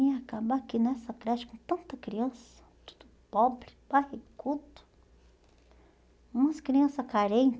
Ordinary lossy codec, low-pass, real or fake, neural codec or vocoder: none; none; real; none